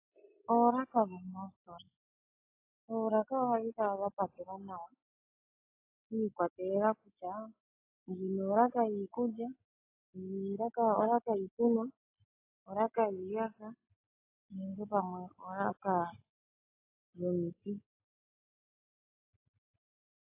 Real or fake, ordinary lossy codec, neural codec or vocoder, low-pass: real; AAC, 32 kbps; none; 3.6 kHz